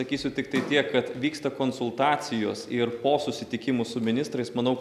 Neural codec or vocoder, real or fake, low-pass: none; real; 14.4 kHz